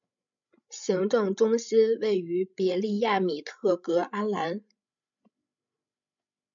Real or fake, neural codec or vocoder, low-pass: fake; codec, 16 kHz, 16 kbps, FreqCodec, larger model; 7.2 kHz